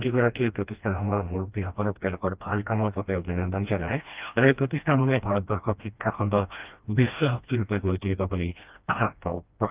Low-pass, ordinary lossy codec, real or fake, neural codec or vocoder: 3.6 kHz; Opus, 32 kbps; fake; codec, 16 kHz, 1 kbps, FreqCodec, smaller model